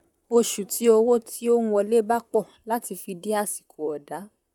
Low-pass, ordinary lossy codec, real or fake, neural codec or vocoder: 19.8 kHz; none; fake; vocoder, 44.1 kHz, 128 mel bands, Pupu-Vocoder